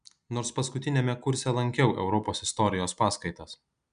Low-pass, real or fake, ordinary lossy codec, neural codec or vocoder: 9.9 kHz; real; MP3, 96 kbps; none